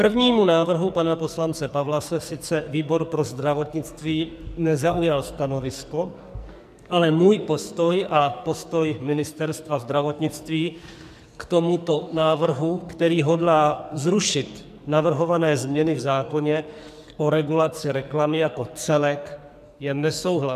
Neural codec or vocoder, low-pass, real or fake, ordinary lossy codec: codec, 44.1 kHz, 2.6 kbps, SNAC; 14.4 kHz; fake; MP3, 96 kbps